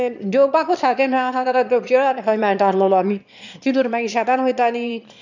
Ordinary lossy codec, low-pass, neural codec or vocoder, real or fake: none; 7.2 kHz; autoencoder, 22.05 kHz, a latent of 192 numbers a frame, VITS, trained on one speaker; fake